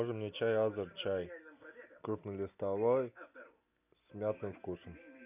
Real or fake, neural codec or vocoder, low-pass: real; none; 3.6 kHz